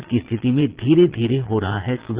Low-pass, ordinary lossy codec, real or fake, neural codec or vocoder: 3.6 kHz; Opus, 16 kbps; fake; vocoder, 22.05 kHz, 80 mel bands, Vocos